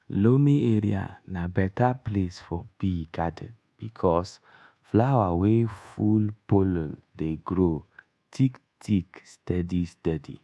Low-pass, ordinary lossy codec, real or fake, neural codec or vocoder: none; none; fake; codec, 24 kHz, 1.2 kbps, DualCodec